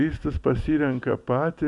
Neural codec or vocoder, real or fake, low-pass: none; real; 10.8 kHz